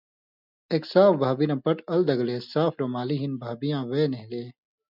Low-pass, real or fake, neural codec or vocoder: 5.4 kHz; real; none